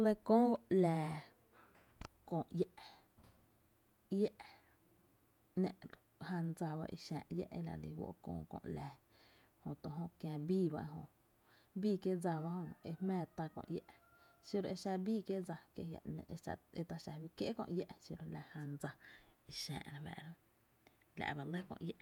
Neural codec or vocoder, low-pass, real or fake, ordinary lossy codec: vocoder, 48 kHz, 128 mel bands, Vocos; 19.8 kHz; fake; none